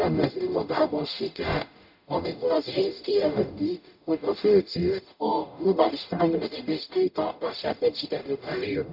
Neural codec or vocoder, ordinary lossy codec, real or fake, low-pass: codec, 44.1 kHz, 0.9 kbps, DAC; none; fake; 5.4 kHz